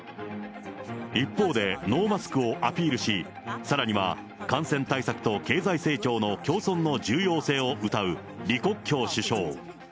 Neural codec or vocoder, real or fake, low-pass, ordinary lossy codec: none; real; none; none